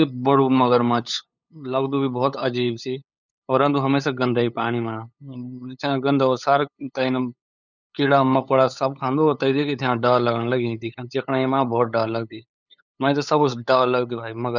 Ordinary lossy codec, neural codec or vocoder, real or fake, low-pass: none; codec, 16 kHz, 8 kbps, FunCodec, trained on LibriTTS, 25 frames a second; fake; 7.2 kHz